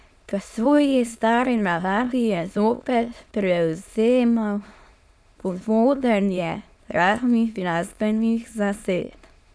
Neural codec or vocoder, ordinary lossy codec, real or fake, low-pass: autoencoder, 22.05 kHz, a latent of 192 numbers a frame, VITS, trained on many speakers; none; fake; none